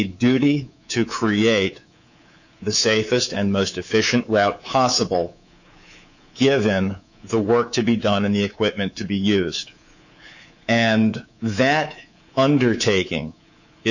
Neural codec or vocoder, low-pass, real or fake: codec, 24 kHz, 3.1 kbps, DualCodec; 7.2 kHz; fake